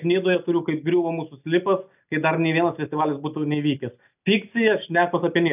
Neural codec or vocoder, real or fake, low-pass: none; real; 3.6 kHz